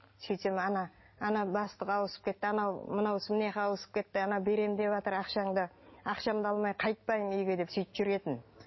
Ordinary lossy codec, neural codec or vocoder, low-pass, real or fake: MP3, 24 kbps; none; 7.2 kHz; real